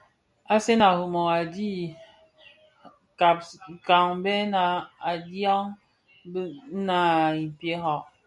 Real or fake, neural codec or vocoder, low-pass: real; none; 10.8 kHz